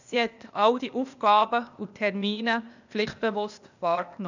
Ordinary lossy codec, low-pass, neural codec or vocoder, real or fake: none; 7.2 kHz; codec, 16 kHz, 0.8 kbps, ZipCodec; fake